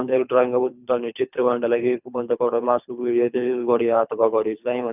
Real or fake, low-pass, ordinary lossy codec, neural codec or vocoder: fake; 3.6 kHz; none; codec, 24 kHz, 3 kbps, HILCodec